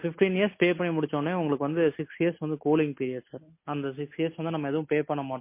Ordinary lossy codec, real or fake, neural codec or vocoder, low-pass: MP3, 24 kbps; real; none; 3.6 kHz